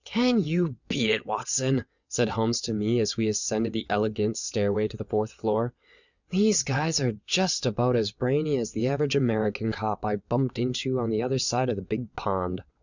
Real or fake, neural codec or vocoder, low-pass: fake; vocoder, 22.05 kHz, 80 mel bands, WaveNeXt; 7.2 kHz